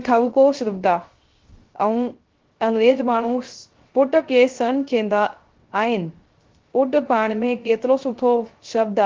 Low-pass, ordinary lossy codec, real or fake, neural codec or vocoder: 7.2 kHz; Opus, 16 kbps; fake; codec, 16 kHz, 0.3 kbps, FocalCodec